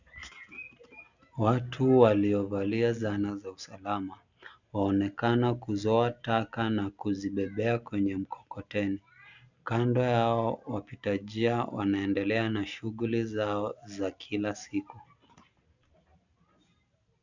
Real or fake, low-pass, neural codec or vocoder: real; 7.2 kHz; none